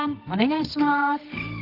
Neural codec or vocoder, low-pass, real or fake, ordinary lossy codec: codec, 24 kHz, 0.9 kbps, WavTokenizer, medium music audio release; 5.4 kHz; fake; Opus, 32 kbps